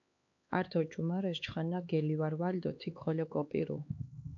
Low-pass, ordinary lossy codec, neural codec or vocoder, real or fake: 7.2 kHz; AAC, 48 kbps; codec, 16 kHz, 4 kbps, X-Codec, HuBERT features, trained on LibriSpeech; fake